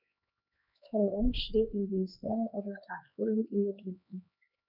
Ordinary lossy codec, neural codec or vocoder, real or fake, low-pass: none; codec, 16 kHz, 2 kbps, X-Codec, HuBERT features, trained on LibriSpeech; fake; 5.4 kHz